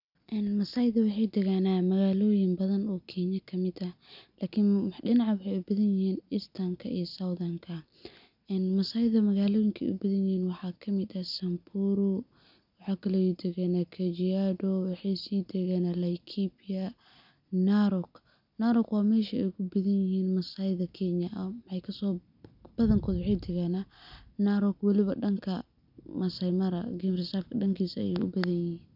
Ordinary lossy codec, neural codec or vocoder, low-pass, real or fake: none; none; 5.4 kHz; real